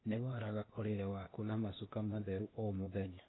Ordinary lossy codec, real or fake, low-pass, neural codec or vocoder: AAC, 16 kbps; fake; 7.2 kHz; codec, 16 kHz, 0.8 kbps, ZipCodec